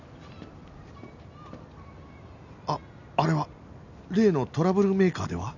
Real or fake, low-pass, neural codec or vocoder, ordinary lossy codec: real; 7.2 kHz; none; none